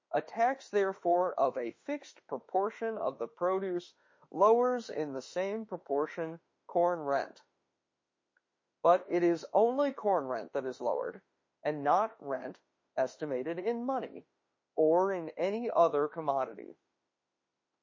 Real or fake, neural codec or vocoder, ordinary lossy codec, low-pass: fake; autoencoder, 48 kHz, 32 numbers a frame, DAC-VAE, trained on Japanese speech; MP3, 32 kbps; 7.2 kHz